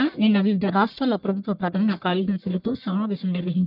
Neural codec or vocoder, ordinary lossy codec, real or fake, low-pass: codec, 44.1 kHz, 1.7 kbps, Pupu-Codec; none; fake; 5.4 kHz